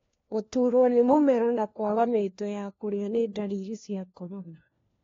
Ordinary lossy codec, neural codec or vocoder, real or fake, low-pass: AAC, 32 kbps; codec, 16 kHz, 1 kbps, FunCodec, trained on LibriTTS, 50 frames a second; fake; 7.2 kHz